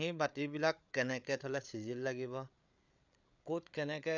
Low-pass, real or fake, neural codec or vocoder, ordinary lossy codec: 7.2 kHz; fake; codec, 16 kHz, 8 kbps, FreqCodec, larger model; none